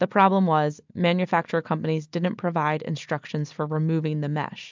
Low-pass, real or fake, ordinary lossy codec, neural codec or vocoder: 7.2 kHz; real; MP3, 64 kbps; none